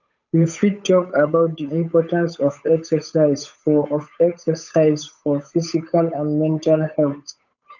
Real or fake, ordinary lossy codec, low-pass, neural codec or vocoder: fake; none; 7.2 kHz; codec, 16 kHz, 8 kbps, FunCodec, trained on Chinese and English, 25 frames a second